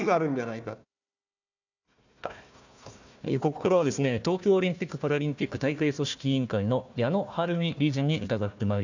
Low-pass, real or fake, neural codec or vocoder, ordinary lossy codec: 7.2 kHz; fake; codec, 16 kHz, 1 kbps, FunCodec, trained on Chinese and English, 50 frames a second; none